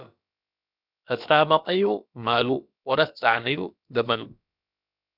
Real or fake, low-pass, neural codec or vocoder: fake; 5.4 kHz; codec, 16 kHz, about 1 kbps, DyCAST, with the encoder's durations